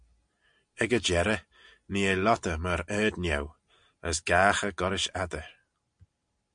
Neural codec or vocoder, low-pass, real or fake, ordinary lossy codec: none; 9.9 kHz; real; MP3, 64 kbps